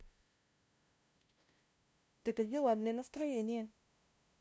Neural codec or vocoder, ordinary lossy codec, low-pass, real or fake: codec, 16 kHz, 0.5 kbps, FunCodec, trained on LibriTTS, 25 frames a second; none; none; fake